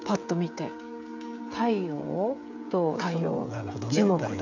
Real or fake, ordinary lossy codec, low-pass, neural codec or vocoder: fake; none; 7.2 kHz; codec, 16 kHz, 16 kbps, FreqCodec, smaller model